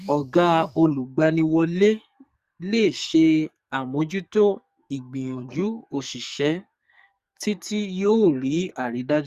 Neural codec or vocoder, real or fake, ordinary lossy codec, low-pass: codec, 44.1 kHz, 2.6 kbps, SNAC; fake; Opus, 64 kbps; 14.4 kHz